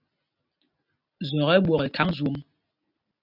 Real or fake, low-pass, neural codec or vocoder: real; 5.4 kHz; none